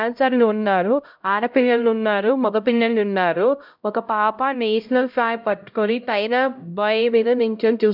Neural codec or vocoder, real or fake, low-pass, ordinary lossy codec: codec, 16 kHz, 0.5 kbps, X-Codec, HuBERT features, trained on LibriSpeech; fake; 5.4 kHz; none